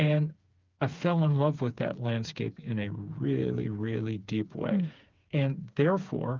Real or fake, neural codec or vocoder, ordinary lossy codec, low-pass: fake; codec, 16 kHz, 4 kbps, FreqCodec, smaller model; Opus, 24 kbps; 7.2 kHz